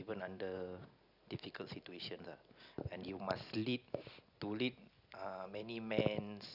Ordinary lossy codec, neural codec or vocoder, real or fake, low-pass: none; none; real; 5.4 kHz